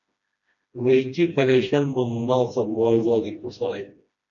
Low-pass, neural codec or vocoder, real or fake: 7.2 kHz; codec, 16 kHz, 1 kbps, FreqCodec, smaller model; fake